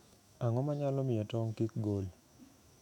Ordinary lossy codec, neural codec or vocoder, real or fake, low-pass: none; autoencoder, 48 kHz, 128 numbers a frame, DAC-VAE, trained on Japanese speech; fake; 19.8 kHz